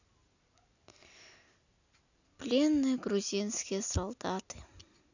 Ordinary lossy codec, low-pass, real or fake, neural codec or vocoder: none; 7.2 kHz; real; none